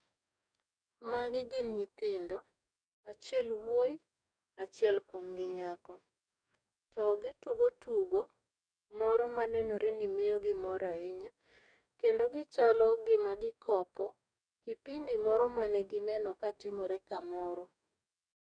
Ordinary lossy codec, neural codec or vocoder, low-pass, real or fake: none; codec, 44.1 kHz, 2.6 kbps, DAC; 9.9 kHz; fake